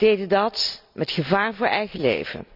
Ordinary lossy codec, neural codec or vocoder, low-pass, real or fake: none; none; 5.4 kHz; real